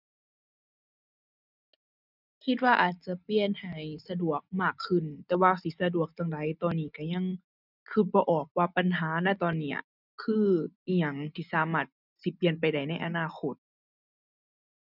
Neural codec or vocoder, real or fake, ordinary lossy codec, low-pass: none; real; none; 5.4 kHz